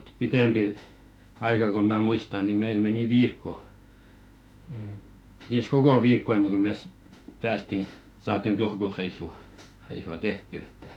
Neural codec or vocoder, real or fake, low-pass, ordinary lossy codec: autoencoder, 48 kHz, 32 numbers a frame, DAC-VAE, trained on Japanese speech; fake; 19.8 kHz; none